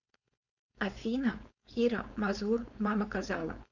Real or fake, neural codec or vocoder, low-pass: fake; codec, 16 kHz, 4.8 kbps, FACodec; 7.2 kHz